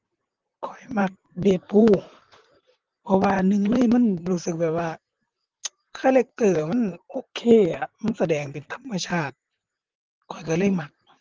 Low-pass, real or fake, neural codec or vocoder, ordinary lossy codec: 7.2 kHz; fake; vocoder, 44.1 kHz, 128 mel bands, Pupu-Vocoder; Opus, 16 kbps